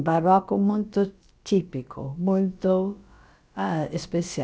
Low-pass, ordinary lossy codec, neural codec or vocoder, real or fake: none; none; codec, 16 kHz, about 1 kbps, DyCAST, with the encoder's durations; fake